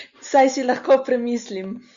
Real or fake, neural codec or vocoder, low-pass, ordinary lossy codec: real; none; 7.2 kHz; Opus, 64 kbps